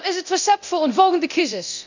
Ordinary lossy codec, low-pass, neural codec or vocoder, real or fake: none; 7.2 kHz; codec, 24 kHz, 0.9 kbps, DualCodec; fake